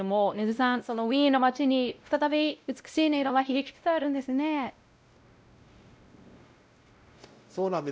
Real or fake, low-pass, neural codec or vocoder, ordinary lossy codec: fake; none; codec, 16 kHz, 0.5 kbps, X-Codec, WavLM features, trained on Multilingual LibriSpeech; none